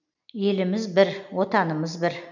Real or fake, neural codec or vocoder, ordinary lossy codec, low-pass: real; none; none; 7.2 kHz